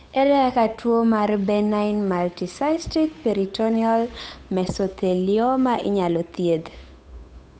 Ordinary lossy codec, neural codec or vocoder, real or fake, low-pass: none; codec, 16 kHz, 8 kbps, FunCodec, trained on Chinese and English, 25 frames a second; fake; none